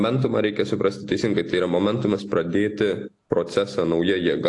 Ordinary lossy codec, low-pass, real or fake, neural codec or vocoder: AAC, 48 kbps; 10.8 kHz; real; none